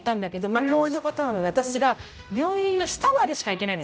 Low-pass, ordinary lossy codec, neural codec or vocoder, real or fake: none; none; codec, 16 kHz, 0.5 kbps, X-Codec, HuBERT features, trained on general audio; fake